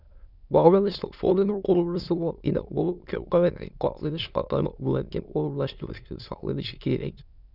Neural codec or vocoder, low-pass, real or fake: autoencoder, 22.05 kHz, a latent of 192 numbers a frame, VITS, trained on many speakers; 5.4 kHz; fake